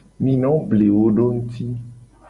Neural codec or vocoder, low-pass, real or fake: none; 10.8 kHz; real